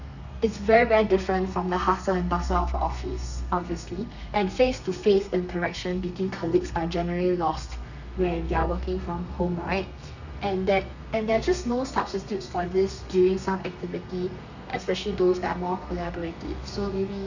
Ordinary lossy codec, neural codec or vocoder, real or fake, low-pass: none; codec, 32 kHz, 1.9 kbps, SNAC; fake; 7.2 kHz